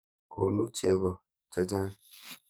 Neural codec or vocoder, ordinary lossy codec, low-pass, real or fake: codec, 44.1 kHz, 2.6 kbps, SNAC; none; none; fake